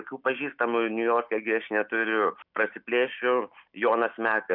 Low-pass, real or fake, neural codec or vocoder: 5.4 kHz; real; none